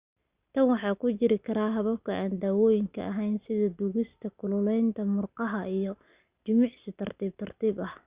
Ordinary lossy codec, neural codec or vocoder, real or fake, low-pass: Opus, 64 kbps; none; real; 3.6 kHz